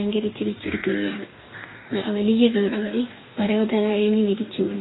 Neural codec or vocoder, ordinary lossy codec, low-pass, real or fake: codec, 44.1 kHz, 2.6 kbps, DAC; AAC, 16 kbps; 7.2 kHz; fake